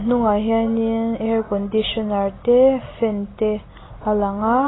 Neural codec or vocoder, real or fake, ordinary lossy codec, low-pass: none; real; AAC, 16 kbps; 7.2 kHz